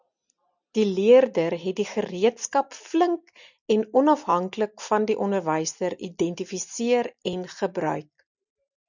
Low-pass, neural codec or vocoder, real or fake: 7.2 kHz; none; real